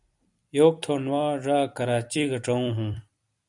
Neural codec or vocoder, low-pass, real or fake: vocoder, 44.1 kHz, 128 mel bands every 256 samples, BigVGAN v2; 10.8 kHz; fake